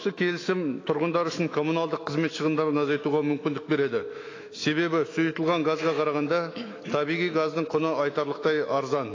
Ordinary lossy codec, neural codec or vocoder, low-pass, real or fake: AAC, 32 kbps; autoencoder, 48 kHz, 128 numbers a frame, DAC-VAE, trained on Japanese speech; 7.2 kHz; fake